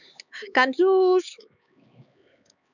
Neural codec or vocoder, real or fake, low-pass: codec, 16 kHz, 4 kbps, X-Codec, HuBERT features, trained on LibriSpeech; fake; 7.2 kHz